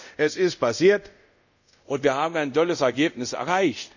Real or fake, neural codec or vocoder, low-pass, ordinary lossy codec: fake; codec, 24 kHz, 0.5 kbps, DualCodec; 7.2 kHz; none